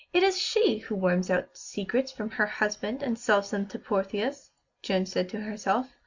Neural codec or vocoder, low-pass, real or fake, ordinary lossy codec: none; 7.2 kHz; real; Opus, 64 kbps